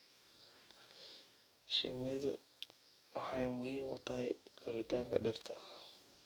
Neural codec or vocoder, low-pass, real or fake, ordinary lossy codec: codec, 44.1 kHz, 2.6 kbps, DAC; none; fake; none